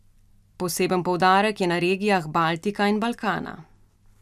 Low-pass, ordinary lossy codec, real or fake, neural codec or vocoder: 14.4 kHz; none; real; none